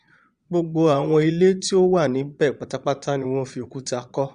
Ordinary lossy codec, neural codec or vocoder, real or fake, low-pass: Opus, 64 kbps; vocoder, 22.05 kHz, 80 mel bands, Vocos; fake; 9.9 kHz